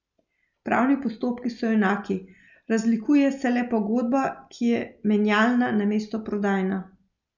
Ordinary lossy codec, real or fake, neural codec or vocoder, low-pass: none; real; none; 7.2 kHz